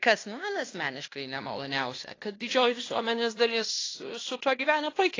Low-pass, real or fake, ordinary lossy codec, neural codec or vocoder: 7.2 kHz; fake; AAC, 32 kbps; codec, 16 kHz in and 24 kHz out, 0.9 kbps, LongCat-Audio-Codec, fine tuned four codebook decoder